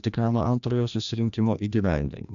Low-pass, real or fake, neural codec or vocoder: 7.2 kHz; fake; codec, 16 kHz, 1 kbps, FreqCodec, larger model